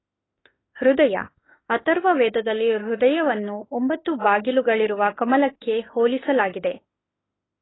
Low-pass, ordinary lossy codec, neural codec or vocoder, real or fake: 7.2 kHz; AAC, 16 kbps; autoencoder, 48 kHz, 32 numbers a frame, DAC-VAE, trained on Japanese speech; fake